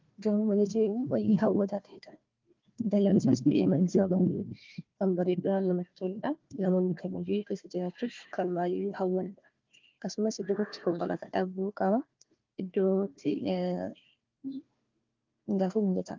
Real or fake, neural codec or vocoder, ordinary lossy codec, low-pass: fake; codec, 16 kHz, 1 kbps, FunCodec, trained on Chinese and English, 50 frames a second; Opus, 24 kbps; 7.2 kHz